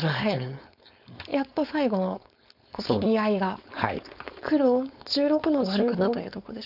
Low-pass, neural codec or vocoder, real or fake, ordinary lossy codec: 5.4 kHz; codec, 16 kHz, 4.8 kbps, FACodec; fake; none